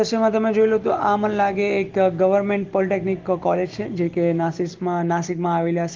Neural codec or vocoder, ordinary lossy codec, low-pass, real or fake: none; Opus, 16 kbps; 7.2 kHz; real